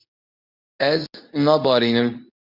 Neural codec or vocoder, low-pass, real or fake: codec, 24 kHz, 0.9 kbps, WavTokenizer, medium speech release version 2; 5.4 kHz; fake